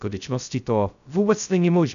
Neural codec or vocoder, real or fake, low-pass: codec, 16 kHz, 0.2 kbps, FocalCodec; fake; 7.2 kHz